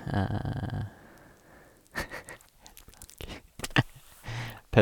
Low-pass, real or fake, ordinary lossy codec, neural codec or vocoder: 19.8 kHz; real; none; none